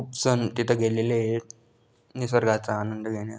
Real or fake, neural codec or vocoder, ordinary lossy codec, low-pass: real; none; none; none